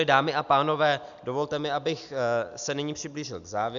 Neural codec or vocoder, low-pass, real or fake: none; 7.2 kHz; real